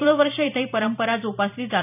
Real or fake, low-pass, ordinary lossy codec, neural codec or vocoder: fake; 3.6 kHz; none; vocoder, 44.1 kHz, 128 mel bands every 256 samples, BigVGAN v2